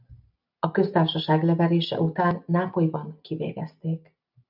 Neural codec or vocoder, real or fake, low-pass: none; real; 5.4 kHz